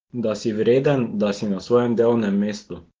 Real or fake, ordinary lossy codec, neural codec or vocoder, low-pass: real; Opus, 16 kbps; none; 7.2 kHz